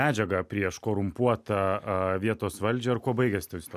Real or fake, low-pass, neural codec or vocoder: real; 14.4 kHz; none